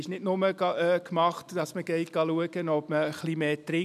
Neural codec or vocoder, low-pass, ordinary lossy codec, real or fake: none; 14.4 kHz; none; real